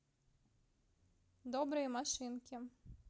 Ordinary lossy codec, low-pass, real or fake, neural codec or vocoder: none; none; real; none